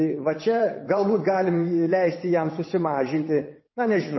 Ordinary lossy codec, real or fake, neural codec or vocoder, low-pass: MP3, 24 kbps; fake; vocoder, 22.05 kHz, 80 mel bands, WaveNeXt; 7.2 kHz